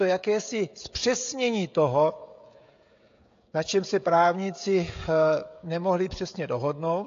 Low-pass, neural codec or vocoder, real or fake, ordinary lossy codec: 7.2 kHz; codec, 16 kHz, 16 kbps, FreqCodec, smaller model; fake; AAC, 48 kbps